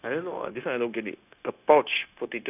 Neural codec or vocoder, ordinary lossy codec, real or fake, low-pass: codec, 16 kHz, 0.9 kbps, LongCat-Audio-Codec; none; fake; 3.6 kHz